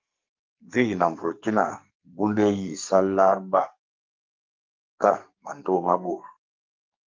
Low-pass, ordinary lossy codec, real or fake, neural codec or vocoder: 7.2 kHz; Opus, 24 kbps; fake; codec, 44.1 kHz, 2.6 kbps, SNAC